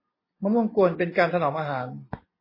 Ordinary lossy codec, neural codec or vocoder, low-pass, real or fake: MP3, 24 kbps; none; 5.4 kHz; real